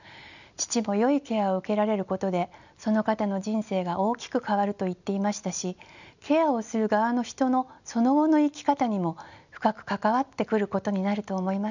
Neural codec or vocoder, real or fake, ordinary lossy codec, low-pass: none; real; none; 7.2 kHz